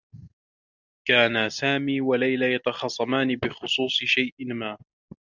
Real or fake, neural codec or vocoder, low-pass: real; none; 7.2 kHz